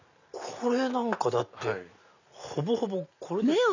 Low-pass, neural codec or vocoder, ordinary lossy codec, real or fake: 7.2 kHz; none; none; real